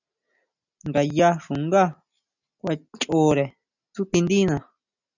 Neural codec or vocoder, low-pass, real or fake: none; 7.2 kHz; real